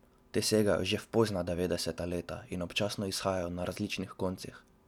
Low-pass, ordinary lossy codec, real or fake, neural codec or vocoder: 19.8 kHz; none; real; none